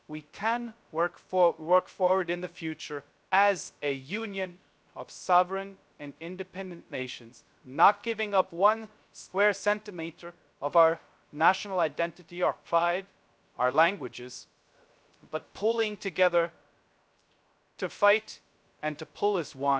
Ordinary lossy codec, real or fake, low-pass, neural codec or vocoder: none; fake; none; codec, 16 kHz, 0.3 kbps, FocalCodec